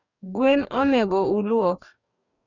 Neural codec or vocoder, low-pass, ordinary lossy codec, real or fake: codec, 44.1 kHz, 2.6 kbps, DAC; 7.2 kHz; none; fake